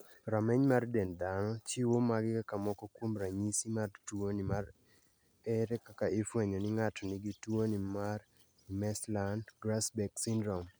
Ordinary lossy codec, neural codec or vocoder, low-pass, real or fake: none; none; none; real